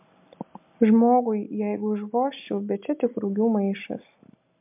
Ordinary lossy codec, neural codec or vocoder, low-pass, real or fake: MP3, 32 kbps; none; 3.6 kHz; real